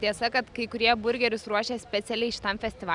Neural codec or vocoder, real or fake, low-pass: none; real; 10.8 kHz